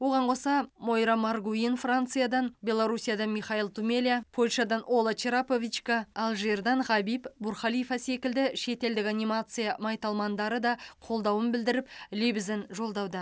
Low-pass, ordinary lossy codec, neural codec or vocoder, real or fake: none; none; none; real